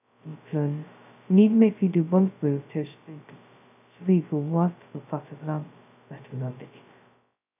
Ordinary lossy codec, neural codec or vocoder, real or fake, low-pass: none; codec, 16 kHz, 0.2 kbps, FocalCodec; fake; 3.6 kHz